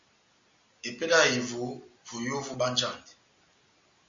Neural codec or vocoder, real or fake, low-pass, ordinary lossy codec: none; real; 7.2 kHz; Opus, 64 kbps